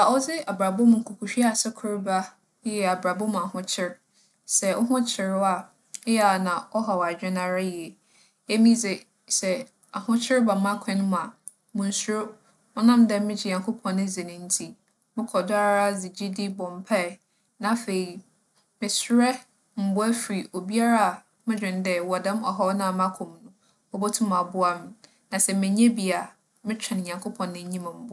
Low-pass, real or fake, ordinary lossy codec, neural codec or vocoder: none; real; none; none